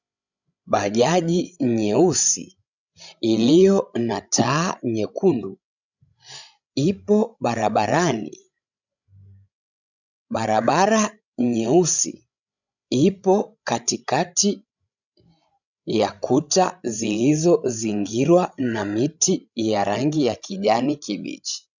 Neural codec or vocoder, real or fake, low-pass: codec, 16 kHz, 16 kbps, FreqCodec, larger model; fake; 7.2 kHz